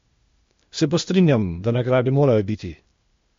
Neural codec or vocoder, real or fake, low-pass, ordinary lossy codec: codec, 16 kHz, 0.8 kbps, ZipCodec; fake; 7.2 kHz; MP3, 48 kbps